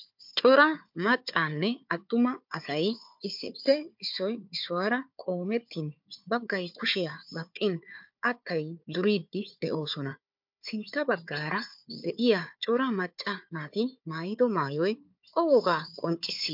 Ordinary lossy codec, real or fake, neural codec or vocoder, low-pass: MP3, 48 kbps; fake; codec, 16 kHz, 4 kbps, FunCodec, trained on Chinese and English, 50 frames a second; 5.4 kHz